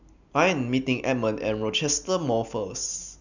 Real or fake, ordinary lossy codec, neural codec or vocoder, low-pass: real; none; none; 7.2 kHz